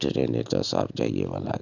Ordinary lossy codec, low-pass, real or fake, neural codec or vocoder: none; 7.2 kHz; real; none